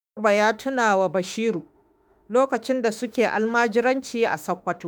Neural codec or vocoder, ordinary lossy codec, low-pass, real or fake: autoencoder, 48 kHz, 32 numbers a frame, DAC-VAE, trained on Japanese speech; none; none; fake